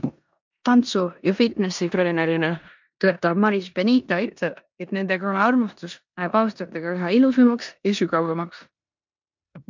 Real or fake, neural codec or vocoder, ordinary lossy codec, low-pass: fake; codec, 16 kHz in and 24 kHz out, 0.9 kbps, LongCat-Audio-Codec, four codebook decoder; MP3, 64 kbps; 7.2 kHz